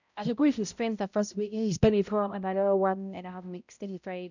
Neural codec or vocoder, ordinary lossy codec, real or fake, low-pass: codec, 16 kHz, 0.5 kbps, X-Codec, HuBERT features, trained on balanced general audio; none; fake; 7.2 kHz